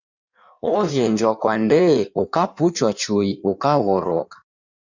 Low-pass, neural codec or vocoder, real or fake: 7.2 kHz; codec, 16 kHz in and 24 kHz out, 1.1 kbps, FireRedTTS-2 codec; fake